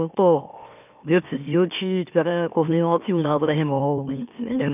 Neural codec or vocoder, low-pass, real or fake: autoencoder, 44.1 kHz, a latent of 192 numbers a frame, MeloTTS; 3.6 kHz; fake